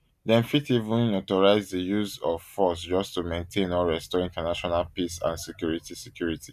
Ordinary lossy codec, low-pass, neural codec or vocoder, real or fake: none; 14.4 kHz; none; real